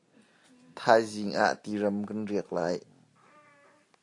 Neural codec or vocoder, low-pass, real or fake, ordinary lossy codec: none; 10.8 kHz; real; AAC, 48 kbps